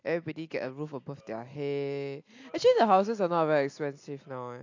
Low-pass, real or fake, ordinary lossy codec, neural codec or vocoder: 7.2 kHz; real; AAC, 48 kbps; none